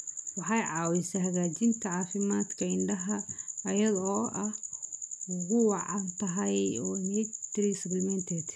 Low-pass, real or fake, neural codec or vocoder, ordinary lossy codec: none; real; none; none